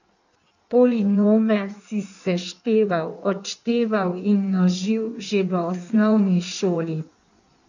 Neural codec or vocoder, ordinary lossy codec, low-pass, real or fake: codec, 16 kHz in and 24 kHz out, 1.1 kbps, FireRedTTS-2 codec; none; 7.2 kHz; fake